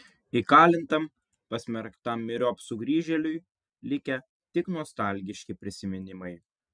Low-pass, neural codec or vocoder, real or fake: 9.9 kHz; none; real